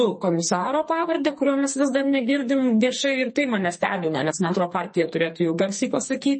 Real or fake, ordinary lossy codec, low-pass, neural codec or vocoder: fake; MP3, 32 kbps; 10.8 kHz; codec, 44.1 kHz, 2.6 kbps, SNAC